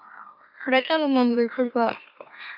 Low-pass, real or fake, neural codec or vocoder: 5.4 kHz; fake; autoencoder, 44.1 kHz, a latent of 192 numbers a frame, MeloTTS